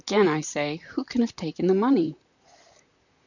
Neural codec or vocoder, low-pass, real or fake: vocoder, 44.1 kHz, 128 mel bands, Pupu-Vocoder; 7.2 kHz; fake